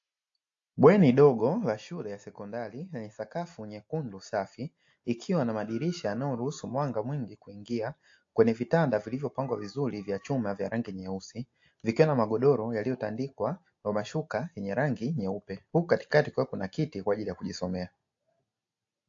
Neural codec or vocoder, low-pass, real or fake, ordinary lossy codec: none; 7.2 kHz; real; AAC, 48 kbps